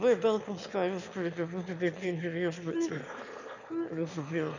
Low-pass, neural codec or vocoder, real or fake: 7.2 kHz; autoencoder, 22.05 kHz, a latent of 192 numbers a frame, VITS, trained on one speaker; fake